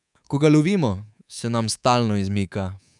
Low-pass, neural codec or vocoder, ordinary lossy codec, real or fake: 10.8 kHz; codec, 24 kHz, 3.1 kbps, DualCodec; none; fake